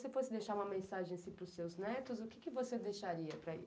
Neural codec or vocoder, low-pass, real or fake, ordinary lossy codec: none; none; real; none